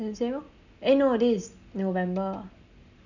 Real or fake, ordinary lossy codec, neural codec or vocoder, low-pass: real; none; none; 7.2 kHz